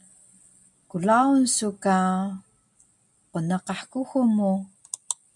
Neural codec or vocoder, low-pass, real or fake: none; 10.8 kHz; real